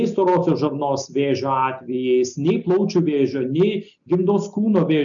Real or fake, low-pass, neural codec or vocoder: real; 7.2 kHz; none